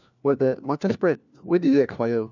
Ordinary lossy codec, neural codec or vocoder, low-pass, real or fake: none; codec, 16 kHz, 1 kbps, FunCodec, trained on LibriTTS, 50 frames a second; 7.2 kHz; fake